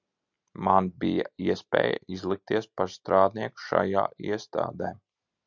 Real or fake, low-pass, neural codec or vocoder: real; 7.2 kHz; none